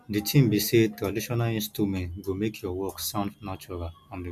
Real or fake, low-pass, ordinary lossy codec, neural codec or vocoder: real; 14.4 kHz; none; none